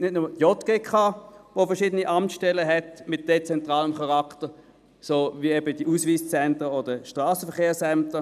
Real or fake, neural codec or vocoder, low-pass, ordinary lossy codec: real; none; 14.4 kHz; none